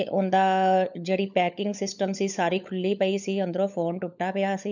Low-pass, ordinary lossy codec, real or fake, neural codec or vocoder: 7.2 kHz; none; fake; codec, 16 kHz, 16 kbps, FunCodec, trained on LibriTTS, 50 frames a second